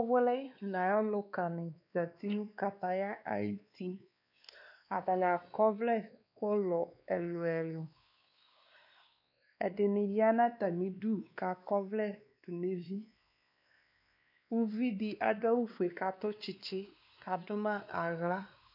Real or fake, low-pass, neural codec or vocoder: fake; 5.4 kHz; codec, 16 kHz, 2 kbps, X-Codec, WavLM features, trained on Multilingual LibriSpeech